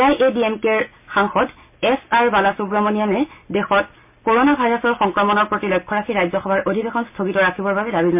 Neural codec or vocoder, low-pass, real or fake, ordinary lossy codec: none; 3.6 kHz; real; MP3, 32 kbps